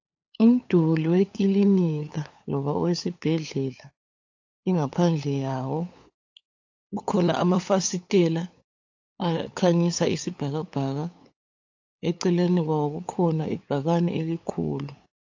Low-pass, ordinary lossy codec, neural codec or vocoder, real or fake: 7.2 kHz; AAC, 48 kbps; codec, 16 kHz, 8 kbps, FunCodec, trained on LibriTTS, 25 frames a second; fake